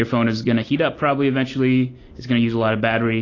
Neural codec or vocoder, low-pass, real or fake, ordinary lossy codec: none; 7.2 kHz; real; AAC, 32 kbps